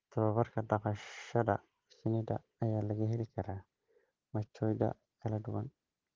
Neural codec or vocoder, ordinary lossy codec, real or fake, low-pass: none; Opus, 16 kbps; real; 7.2 kHz